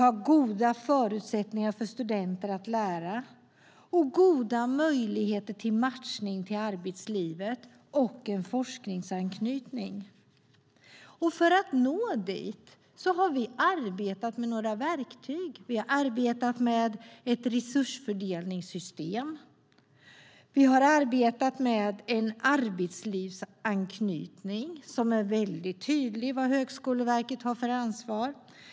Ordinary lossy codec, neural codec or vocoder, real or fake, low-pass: none; none; real; none